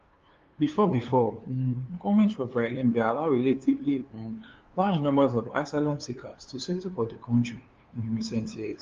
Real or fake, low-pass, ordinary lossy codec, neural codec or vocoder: fake; 7.2 kHz; Opus, 32 kbps; codec, 16 kHz, 2 kbps, FunCodec, trained on LibriTTS, 25 frames a second